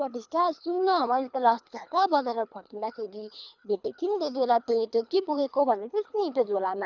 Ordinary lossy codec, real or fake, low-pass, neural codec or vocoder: none; fake; 7.2 kHz; codec, 24 kHz, 3 kbps, HILCodec